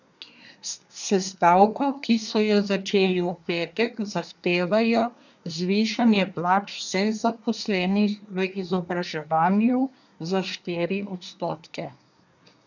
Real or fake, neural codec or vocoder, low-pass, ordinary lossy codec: fake; codec, 24 kHz, 1 kbps, SNAC; 7.2 kHz; none